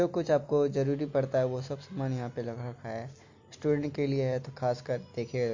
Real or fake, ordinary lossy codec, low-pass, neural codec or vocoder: real; MP3, 48 kbps; 7.2 kHz; none